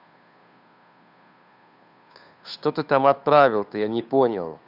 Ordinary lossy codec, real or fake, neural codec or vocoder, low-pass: none; fake; codec, 16 kHz, 2 kbps, FunCodec, trained on Chinese and English, 25 frames a second; 5.4 kHz